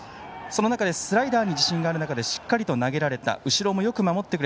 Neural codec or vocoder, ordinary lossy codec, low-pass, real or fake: none; none; none; real